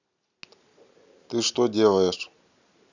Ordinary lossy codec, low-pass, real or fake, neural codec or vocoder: none; 7.2 kHz; real; none